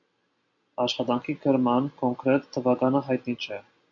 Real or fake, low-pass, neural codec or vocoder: real; 7.2 kHz; none